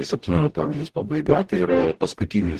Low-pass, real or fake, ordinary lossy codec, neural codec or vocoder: 14.4 kHz; fake; Opus, 16 kbps; codec, 44.1 kHz, 0.9 kbps, DAC